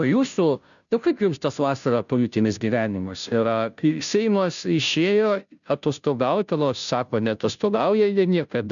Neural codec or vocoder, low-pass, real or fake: codec, 16 kHz, 0.5 kbps, FunCodec, trained on Chinese and English, 25 frames a second; 7.2 kHz; fake